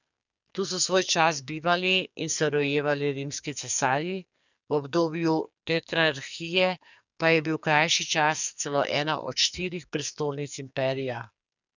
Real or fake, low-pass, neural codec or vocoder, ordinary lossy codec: fake; 7.2 kHz; codec, 44.1 kHz, 2.6 kbps, SNAC; none